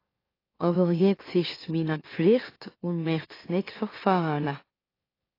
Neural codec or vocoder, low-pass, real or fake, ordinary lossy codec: autoencoder, 44.1 kHz, a latent of 192 numbers a frame, MeloTTS; 5.4 kHz; fake; AAC, 24 kbps